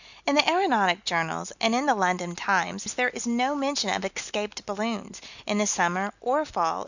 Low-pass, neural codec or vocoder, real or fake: 7.2 kHz; none; real